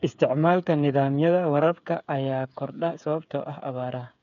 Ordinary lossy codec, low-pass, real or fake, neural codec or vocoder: none; 7.2 kHz; fake; codec, 16 kHz, 8 kbps, FreqCodec, smaller model